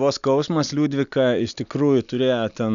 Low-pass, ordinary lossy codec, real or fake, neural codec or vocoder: 7.2 kHz; MP3, 96 kbps; real; none